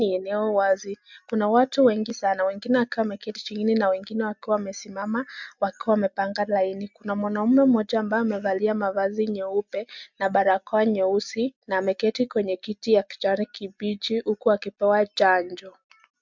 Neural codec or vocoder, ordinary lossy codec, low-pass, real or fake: none; MP3, 64 kbps; 7.2 kHz; real